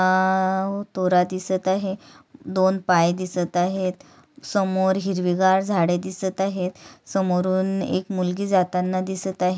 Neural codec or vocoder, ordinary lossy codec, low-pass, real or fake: none; none; none; real